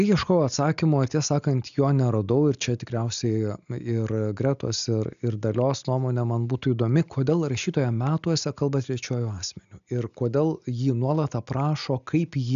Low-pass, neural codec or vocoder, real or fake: 7.2 kHz; none; real